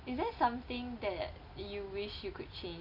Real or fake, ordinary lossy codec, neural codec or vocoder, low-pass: real; none; none; 5.4 kHz